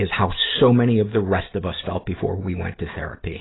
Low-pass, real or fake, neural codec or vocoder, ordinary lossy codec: 7.2 kHz; real; none; AAC, 16 kbps